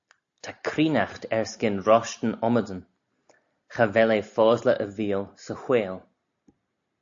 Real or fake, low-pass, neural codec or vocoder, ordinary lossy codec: real; 7.2 kHz; none; AAC, 64 kbps